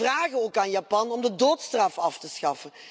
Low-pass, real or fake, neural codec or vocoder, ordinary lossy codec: none; real; none; none